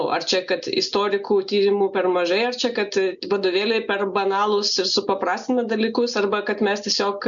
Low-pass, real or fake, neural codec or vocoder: 7.2 kHz; real; none